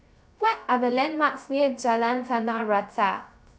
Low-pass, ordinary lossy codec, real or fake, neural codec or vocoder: none; none; fake; codec, 16 kHz, 0.3 kbps, FocalCodec